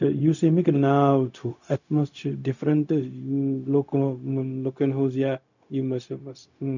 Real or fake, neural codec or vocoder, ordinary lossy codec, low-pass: fake; codec, 16 kHz, 0.4 kbps, LongCat-Audio-Codec; none; 7.2 kHz